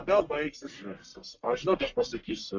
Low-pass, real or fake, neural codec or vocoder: 7.2 kHz; fake; codec, 44.1 kHz, 1.7 kbps, Pupu-Codec